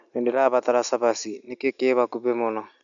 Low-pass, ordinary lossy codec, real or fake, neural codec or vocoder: 7.2 kHz; none; real; none